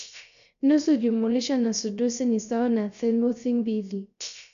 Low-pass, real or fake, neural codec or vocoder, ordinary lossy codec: 7.2 kHz; fake; codec, 16 kHz, 0.3 kbps, FocalCodec; none